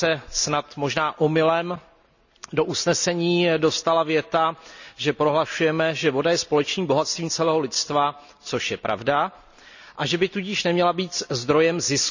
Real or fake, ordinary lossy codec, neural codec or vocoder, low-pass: real; none; none; 7.2 kHz